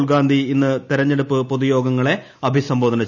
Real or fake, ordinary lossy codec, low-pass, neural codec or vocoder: real; none; 7.2 kHz; none